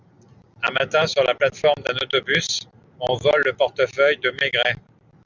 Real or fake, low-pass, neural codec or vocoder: real; 7.2 kHz; none